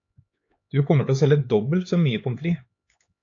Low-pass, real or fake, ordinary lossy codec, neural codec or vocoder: 7.2 kHz; fake; AAC, 48 kbps; codec, 16 kHz, 4 kbps, X-Codec, HuBERT features, trained on LibriSpeech